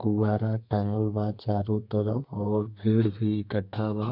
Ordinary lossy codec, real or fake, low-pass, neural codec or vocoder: none; fake; 5.4 kHz; codec, 32 kHz, 1.9 kbps, SNAC